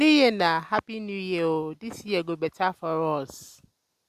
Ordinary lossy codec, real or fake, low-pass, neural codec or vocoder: Opus, 64 kbps; real; 14.4 kHz; none